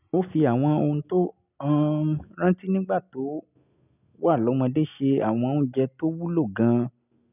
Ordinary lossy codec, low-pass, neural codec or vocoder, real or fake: none; 3.6 kHz; none; real